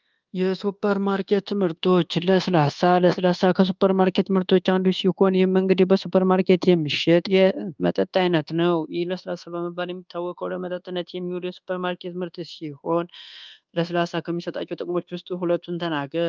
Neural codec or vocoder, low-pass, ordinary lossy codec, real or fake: codec, 24 kHz, 1.2 kbps, DualCodec; 7.2 kHz; Opus, 32 kbps; fake